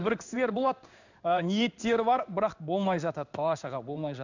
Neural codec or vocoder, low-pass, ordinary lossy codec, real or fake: codec, 16 kHz in and 24 kHz out, 1 kbps, XY-Tokenizer; 7.2 kHz; none; fake